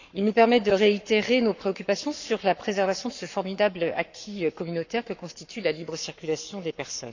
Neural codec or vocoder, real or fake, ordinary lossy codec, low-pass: codec, 44.1 kHz, 7.8 kbps, Pupu-Codec; fake; none; 7.2 kHz